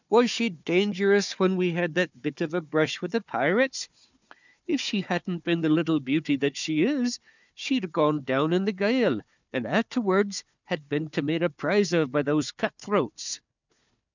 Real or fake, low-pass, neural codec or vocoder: fake; 7.2 kHz; codec, 16 kHz, 4 kbps, FunCodec, trained on Chinese and English, 50 frames a second